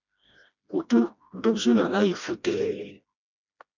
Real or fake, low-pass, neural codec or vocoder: fake; 7.2 kHz; codec, 16 kHz, 1 kbps, FreqCodec, smaller model